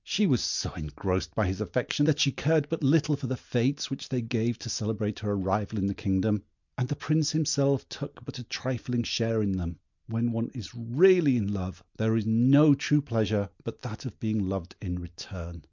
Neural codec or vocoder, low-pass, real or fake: none; 7.2 kHz; real